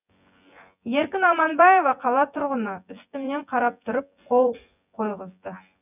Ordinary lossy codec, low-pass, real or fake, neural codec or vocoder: none; 3.6 kHz; fake; vocoder, 24 kHz, 100 mel bands, Vocos